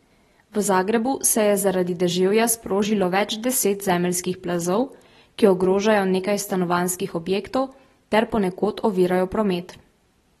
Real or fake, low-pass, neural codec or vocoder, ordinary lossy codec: real; 19.8 kHz; none; AAC, 32 kbps